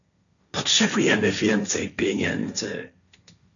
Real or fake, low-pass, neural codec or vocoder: fake; 7.2 kHz; codec, 16 kHz, 1.1 kbps, Voila-Tokenizer